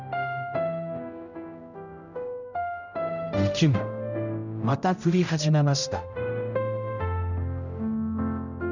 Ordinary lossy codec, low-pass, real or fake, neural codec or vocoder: none; 7.2 kHz; fake; codec, 16 kHz, 0.5 kbps, X-Codec, HuBERT features, trained on general audio